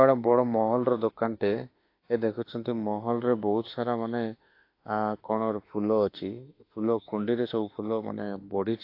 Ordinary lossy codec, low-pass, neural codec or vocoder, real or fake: AAC, 32 kbps; 5.4 kHz; autoencoder, 48 kHz, 32 numbers a frame, DAC-VAE, trained on Japanese speech; fake